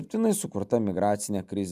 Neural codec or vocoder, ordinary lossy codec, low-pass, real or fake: none; MP3, 96 kbps; 14.4 kHz; real